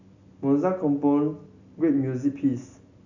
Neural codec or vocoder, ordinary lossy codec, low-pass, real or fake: none; none; 7.2 kHz; real